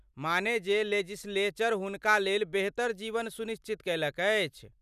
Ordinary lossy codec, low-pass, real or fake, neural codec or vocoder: none; 14.4 kHz; real; none